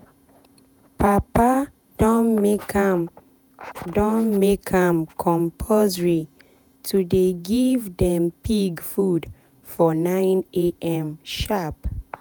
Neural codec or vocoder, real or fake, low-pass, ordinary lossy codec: vocoder, 48 kHz, 128 mel bands, Vocos; fake; none; none